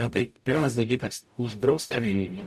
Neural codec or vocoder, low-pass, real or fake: codec, 44.1 kHz, 0.9 kbps, DAC; 14.4 kHz; fake